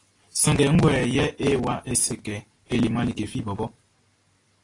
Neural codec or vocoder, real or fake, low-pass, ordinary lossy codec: none; real; 10.8 kHz; AAC, 32 kbps